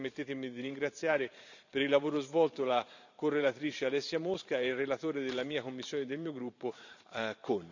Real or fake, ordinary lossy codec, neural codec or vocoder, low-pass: fake; none; vocoder, 44.1 kHz, 128 mel bands every 512 samples, BigVGAN v2; 7.2 kHz